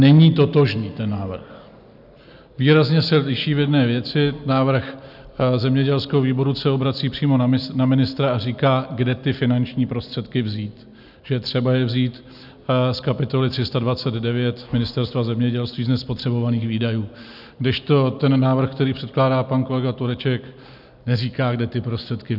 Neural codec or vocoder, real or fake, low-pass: none; real; 5.4 kHz